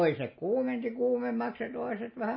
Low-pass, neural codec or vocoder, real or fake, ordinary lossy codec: 7.2 kHz; none; real; MP3, 24 kbps